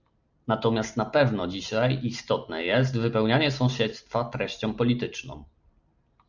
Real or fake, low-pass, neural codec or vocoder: real; 7.2 kHz; none